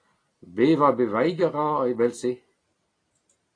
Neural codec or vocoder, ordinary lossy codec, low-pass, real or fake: none; AAC, 32 kbps; 9.9 kHz; real